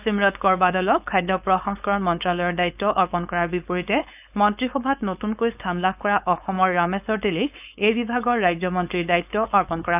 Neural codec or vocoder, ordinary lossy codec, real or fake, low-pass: codec, 16 kHz, 4.8 kbps, FACodec; none; fake; 3.6 kHz